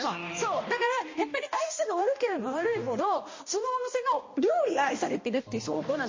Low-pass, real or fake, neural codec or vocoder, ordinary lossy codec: 7.2 kHz; fake; codec, 16 kHz, 1 kbps, X-Codec, HuBERT features, trained on balanced general audio; MP3, 32 kbps